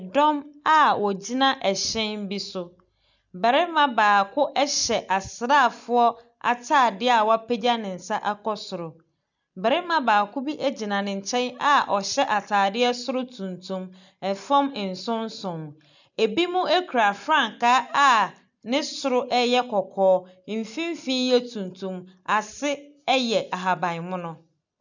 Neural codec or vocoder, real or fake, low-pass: none; real; 7.2 kHz